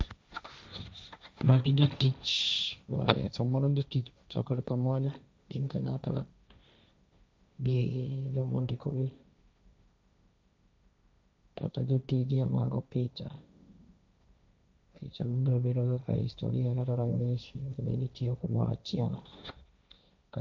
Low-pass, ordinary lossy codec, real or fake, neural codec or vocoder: none; none; fake; codec, 16 kHz, 1.1 kbps, Voila-Tokenizer